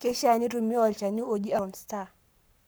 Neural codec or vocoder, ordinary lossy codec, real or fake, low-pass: codec, 44.1 kHz, 7.8 kbps, Pupu-Codec; none; fake; none